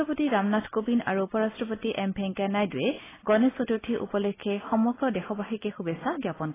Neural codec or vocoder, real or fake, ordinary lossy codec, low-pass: none; real; AAC, 16 kbps; 3.6 kHz